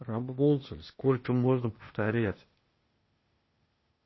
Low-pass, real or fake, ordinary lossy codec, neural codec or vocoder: 7.2 kHz; fake; MP3, 24 kbps; codec, 16 kHz, 0.8 kbps, ZipCodec